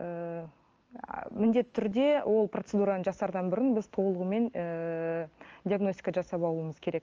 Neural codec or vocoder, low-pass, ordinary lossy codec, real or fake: none; 7.2 kHz; Opus, 24 kbps; real